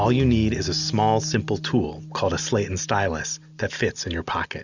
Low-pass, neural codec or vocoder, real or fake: 7.2 kHz; none; real